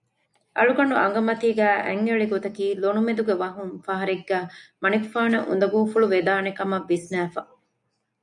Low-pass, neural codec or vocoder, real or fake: 10.8 kHz; none; real